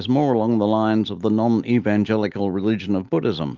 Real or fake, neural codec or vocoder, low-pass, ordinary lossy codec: real; none; 7.2 kHz; Opus, 32 kbps